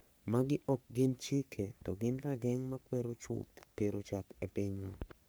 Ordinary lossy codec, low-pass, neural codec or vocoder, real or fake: none; none; codec, 44.1 kHz, 3.4 kbps, Pupu-Codec; fake